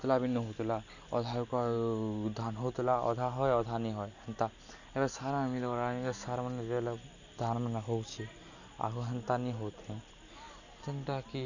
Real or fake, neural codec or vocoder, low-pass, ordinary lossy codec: real; none; 7.2 kHz; none